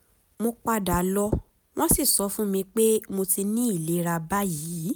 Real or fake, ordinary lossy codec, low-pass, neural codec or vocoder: real; none; none; none